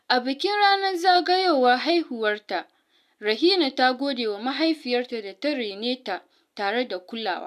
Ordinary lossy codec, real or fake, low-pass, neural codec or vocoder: none; real; 14.4 kHz; none